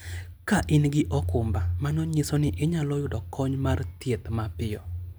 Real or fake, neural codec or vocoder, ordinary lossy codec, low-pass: real; none; none; none